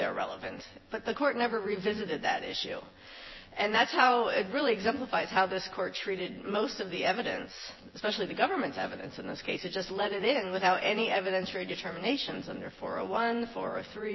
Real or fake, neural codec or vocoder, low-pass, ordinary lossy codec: fake; vocoder, 24 kHz, 100 mel bands, Vocos; 7.2 kHz; MP3, 24 kbps